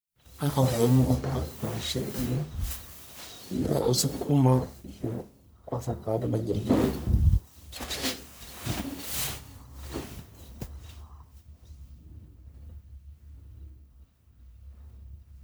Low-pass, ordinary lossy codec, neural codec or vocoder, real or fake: none; none; codec, 44.1 kHz, 1.7 kbps, Pupu-Codec; fake